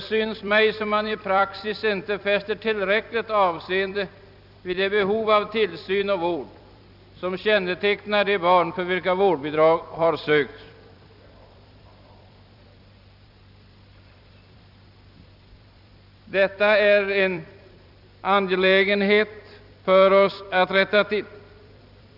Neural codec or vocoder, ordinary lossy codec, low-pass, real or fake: vocoder, 44.1 kHz, 128 mel bands every 256 samples, BigVGAN v2; none; 5.4 kHz; fake